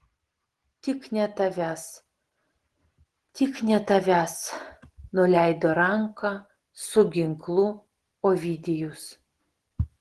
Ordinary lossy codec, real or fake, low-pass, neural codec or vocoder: Opus, 16 kbps; real; 10.8 kHz; none